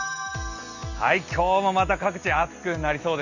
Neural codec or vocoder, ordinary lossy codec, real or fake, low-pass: none; none; real; 7.2 kHz